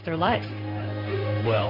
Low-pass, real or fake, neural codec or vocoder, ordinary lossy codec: 5.4 kHz; fake; codec, 16 kHz in and 24 kHz out, 1 kbps, XY-Tokenizer; AAC, 32 kbps